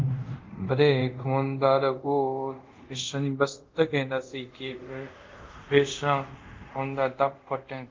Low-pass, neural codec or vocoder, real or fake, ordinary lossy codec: 7.2 kHz; codec, 24 kHz, 0.5 kbps, DualCodec; fake; Opus, 24 kbps